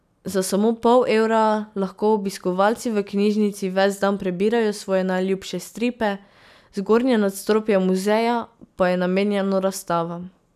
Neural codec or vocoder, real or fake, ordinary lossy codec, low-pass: none; real; none; 14.4 kHz